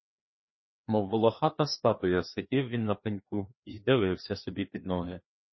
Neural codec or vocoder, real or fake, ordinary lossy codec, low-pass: codec, 16 kHz, 2 kbps, FreqCodec, larger model; fake; MP3, 24 kbps; 7.2 kHz